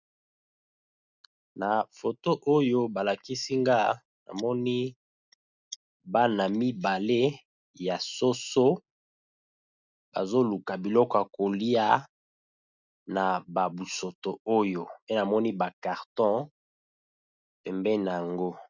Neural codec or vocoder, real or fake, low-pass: none; real; 7.2 kHz